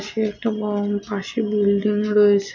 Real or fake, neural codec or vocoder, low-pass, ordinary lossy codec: real; none; 7.2 kHz; MP3, 48 kbps